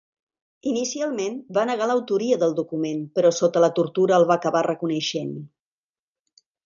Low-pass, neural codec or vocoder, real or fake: 7.2 kHz; none; real